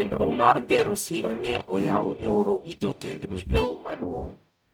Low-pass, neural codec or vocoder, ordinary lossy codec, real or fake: none; codec, 44.1 kHz, 0.9 kbps, DAC; none; fake